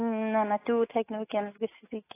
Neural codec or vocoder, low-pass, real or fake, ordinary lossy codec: autoencoder, 48 kHz, 128 numbers a frame, DAC-VAE, trained on Japanese speech; 3.6 kHz; fake; AAC, 16 kbps